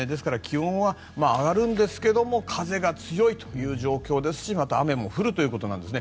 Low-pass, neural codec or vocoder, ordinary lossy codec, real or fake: none; none; none; real